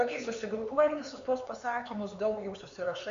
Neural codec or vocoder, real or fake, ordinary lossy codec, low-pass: codec, 16 kHz, 4 kbps, X-Codec, HuBERT features, trained on LibriSpeech; fake; MP3, 64 kbps; 7.2 kHz